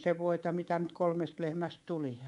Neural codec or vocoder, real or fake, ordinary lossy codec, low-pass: codec, 24 kHz, 3.1 kbps, DualCodec; fake; none; 10.8 kHz